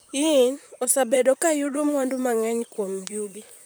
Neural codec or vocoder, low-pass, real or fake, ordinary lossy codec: vocoder, 44.1 kHz, 128 mel bands, Pupu-Vocoder; none; fake; none